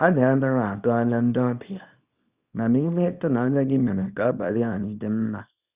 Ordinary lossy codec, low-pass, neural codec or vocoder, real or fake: Opus, 64 kbps; 3.6 kHz; codec, 24 kHz, 0.9 kbps, WavTokenizer, small release; fake